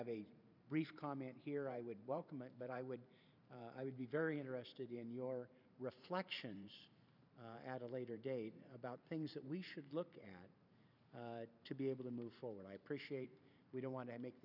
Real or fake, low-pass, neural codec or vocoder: fake; 5.4 kHz; vocoder, 44.1 kHz, 128 mel bands every 512 samples, BigVGAN v2